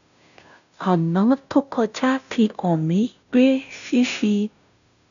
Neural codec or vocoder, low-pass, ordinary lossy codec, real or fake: codec, 16 kHz, 0.5 kbps, FunCodec, trained on Chinese and English, 25 frames a second; 7.2 kHz; none; fake